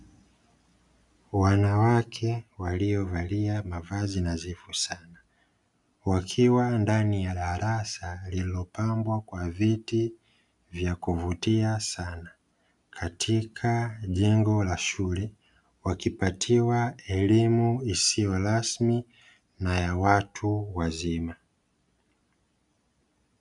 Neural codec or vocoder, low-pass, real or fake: none; 10.8 kHz; real